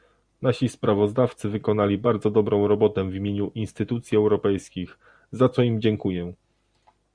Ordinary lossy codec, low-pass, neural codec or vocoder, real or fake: Opus, 64 kbps; 9.9 kHz; none; real